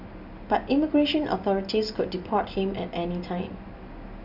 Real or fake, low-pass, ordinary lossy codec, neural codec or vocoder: real; 5.4 kHz; none; none